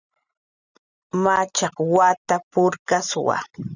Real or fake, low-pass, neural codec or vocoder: real; 7.2 kHz; none